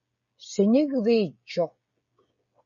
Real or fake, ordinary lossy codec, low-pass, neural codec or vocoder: fake; MP3, 32 kbps; 7.2 kHz; codec, 16 kHz, 16 kbps, FreqCodec, smaller model